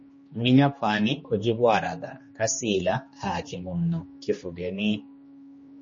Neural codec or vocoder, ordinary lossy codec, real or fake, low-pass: codec, 16 kHz, 2 kbps, X-Codec, HuBERT features, trained on general audio; MP3, 32 kbps; fake; 7.2 kHz